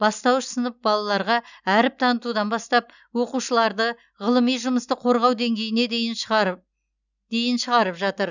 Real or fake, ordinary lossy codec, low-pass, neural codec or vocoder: real; none; 7.2 kHz; none